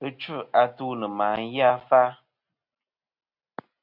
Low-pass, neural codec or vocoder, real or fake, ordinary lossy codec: 5.4 kHz; none; real; Opus, 64 kbps